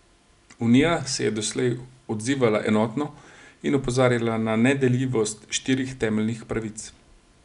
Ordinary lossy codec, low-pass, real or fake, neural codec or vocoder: none; 10.8 kHz; real; none